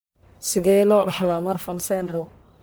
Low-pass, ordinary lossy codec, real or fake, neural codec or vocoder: none; none; fake; codec, 44.1 kHz, 1.7 kbps, Pupu-Codec